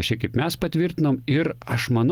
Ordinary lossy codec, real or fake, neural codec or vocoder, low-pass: Opus, 24 kbps; real; none; 19.8 kHz